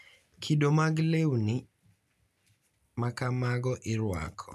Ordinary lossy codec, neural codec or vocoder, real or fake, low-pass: none; none; real; 14.4 kHz